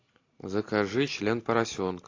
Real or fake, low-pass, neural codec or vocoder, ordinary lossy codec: real; 7.2 kHz; none; AAC, 32 kbps